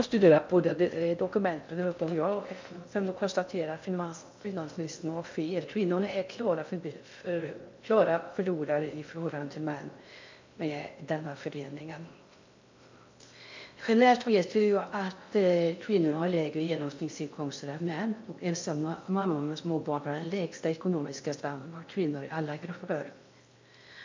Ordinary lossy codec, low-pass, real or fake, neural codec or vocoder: MP3, 64 kbps; 7.2 kHz; fake; codec, 16 kHz in and 24 kHz out, 0.6 kbps, FocalCodec, streaming, 4096 codes